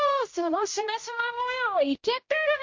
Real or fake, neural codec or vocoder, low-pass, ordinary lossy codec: fake; codec, 16 kHz, 0.5 kbps, X-Codec, HuBERT features, trained on general audio; 7.2 kHz; MP3, 48 kbps